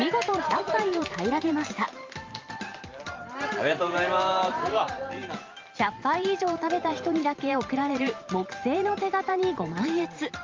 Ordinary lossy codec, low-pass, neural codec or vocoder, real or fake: Opus, 24 kbps; 7.2 kHz; vocoder, 44.1 kHz, 128 mel bands every 512 samples, BigVGAN v2; fake